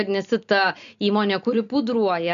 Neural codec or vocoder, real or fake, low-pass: none; real; 7.2 kHz